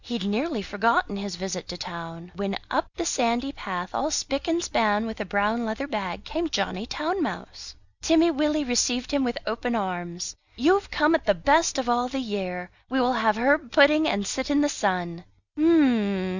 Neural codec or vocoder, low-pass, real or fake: none; 7.2 kHz; real